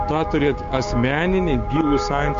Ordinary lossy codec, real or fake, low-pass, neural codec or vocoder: MP3, 48 kbps; fake; 7.2 kHz; codec, 16 kHz, 6 kbps, DAC